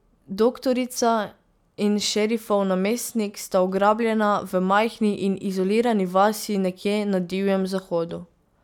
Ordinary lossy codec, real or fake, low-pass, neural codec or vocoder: none; real; 19.8 kHz; none